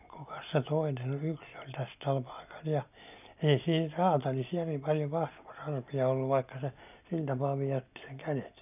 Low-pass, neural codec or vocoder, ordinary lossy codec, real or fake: 3.6 kHz; none; none; real